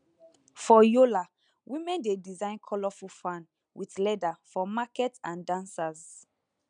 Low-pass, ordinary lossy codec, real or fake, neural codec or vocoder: 9.9 kHz; none; real; none